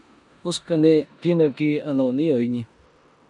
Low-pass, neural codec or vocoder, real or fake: 10.8 kHz; codec, 16 kHz in and 24 kHz out, 0.9 kbps, LongCat-Audio-Codec, four codebook decoder; fake